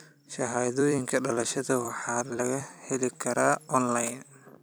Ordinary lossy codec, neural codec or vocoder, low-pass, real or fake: none; vocoder, 44.1 kHz, 128 mel bands every 256 samples, BigVGAN v2; none; fake